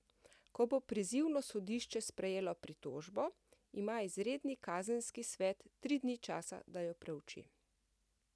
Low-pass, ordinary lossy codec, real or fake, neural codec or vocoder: none; none; real; none